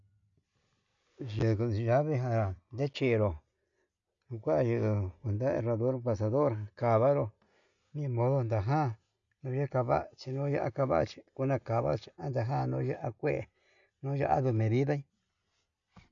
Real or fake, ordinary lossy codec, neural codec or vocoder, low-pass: real; MP3, 96 kbps; none; 7.2 kHz